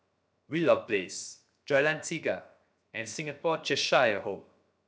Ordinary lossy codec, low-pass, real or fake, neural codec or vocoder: none; none; fake; codec, 16 kHz, 0.7 kbps, FocalCodec